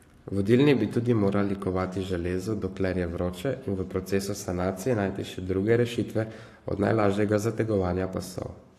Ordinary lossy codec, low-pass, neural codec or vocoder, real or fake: AAC, 64 kbps; 14.4 kHz; codec, 44.1 kHz, 7.8 kbps, Pupu-Codec; fake